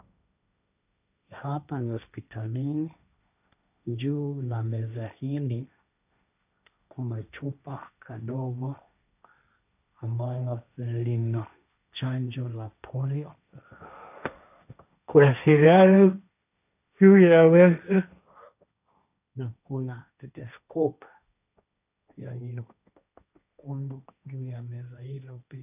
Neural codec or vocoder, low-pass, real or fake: codec, 16 kHz, 1.1 kbps, Voila-Tokenizer; 3.6 kHz; fake